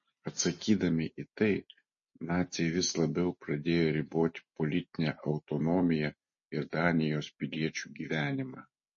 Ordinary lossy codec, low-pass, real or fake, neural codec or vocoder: MP3, 32 kbps; 7.2 kHz; real; none